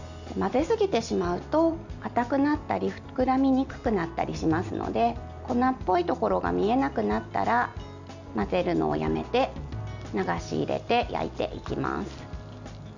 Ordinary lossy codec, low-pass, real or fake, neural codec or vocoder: none; 7.2 kHz; real; none